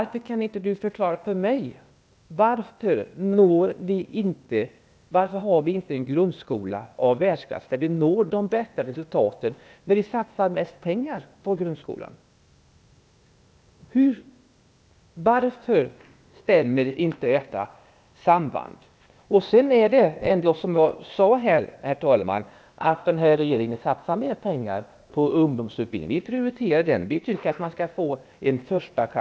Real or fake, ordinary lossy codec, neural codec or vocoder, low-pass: fake; none; codec, 16 kHz, 0.8 kbps, ZipCodec; none